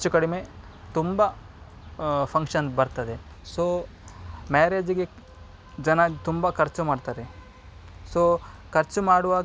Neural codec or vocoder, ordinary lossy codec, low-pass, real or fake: none; none; none; real